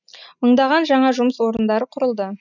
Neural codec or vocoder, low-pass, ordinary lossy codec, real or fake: none; 7.2 kHz; none; real